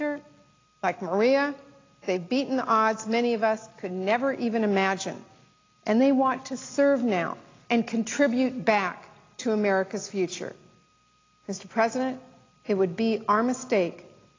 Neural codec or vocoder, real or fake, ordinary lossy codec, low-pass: none; real; AAC, 32 kbps; 7.2 kHz